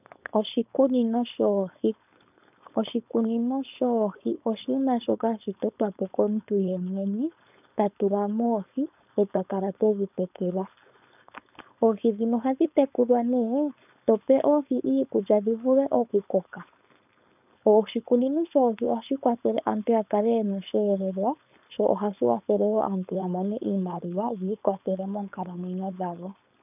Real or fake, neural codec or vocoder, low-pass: fake; codec, 16 kHz, 4.8 kbps, FACodec; 3.6 kHz